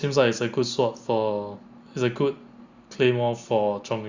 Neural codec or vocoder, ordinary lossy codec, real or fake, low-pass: none; Opus, 64 kbps; real; 7.2 kHz